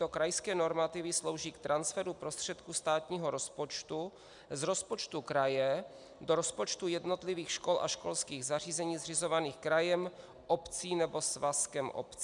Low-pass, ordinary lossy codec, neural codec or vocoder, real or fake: 10.8 kHz; MP3, 96 kbps; none; real